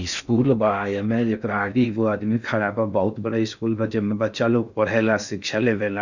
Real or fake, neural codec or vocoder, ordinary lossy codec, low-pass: fake; codec, 16 kHz in and 24 kHz out, 0.6 kbps, FocalCodec, streaming, 4096 codes; none; 7.2 kHz